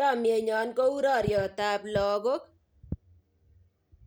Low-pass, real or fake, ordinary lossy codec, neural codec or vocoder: none; real; none; none